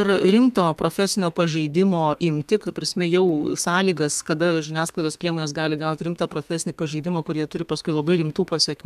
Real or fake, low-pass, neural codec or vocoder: fake; 14.4 kHz; codec, 32 kHz, 1.9 kbps, SNAC